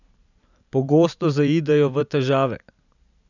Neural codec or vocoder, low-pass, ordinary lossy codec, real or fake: vocoder, 44.1 kHz, 128 mel bands every 512 samples, BigVGAN v2; 7.2 kHz; none; fake